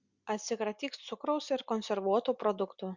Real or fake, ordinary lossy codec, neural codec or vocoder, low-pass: real; Opus, 64 kbps; none; 7.2 kHz